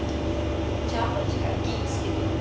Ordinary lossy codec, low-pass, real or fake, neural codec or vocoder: none; none; real; none